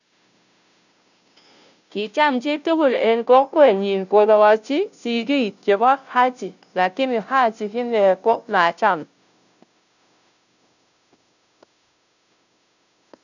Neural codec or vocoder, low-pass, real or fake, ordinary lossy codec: codec, 16 kHz, 0.5 kbps, FunCodec, trained on Chinese and English, 25 frames a second; 7.2 kHz; fake; none